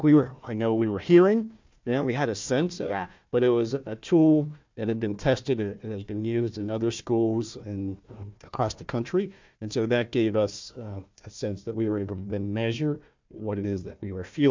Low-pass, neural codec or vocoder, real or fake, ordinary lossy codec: 7.2 kHz; codec, 16 kHz, 1 kbps, FunCodec, trained on Chinese and English, 50 frames a second; fake; MP3, 64 kbps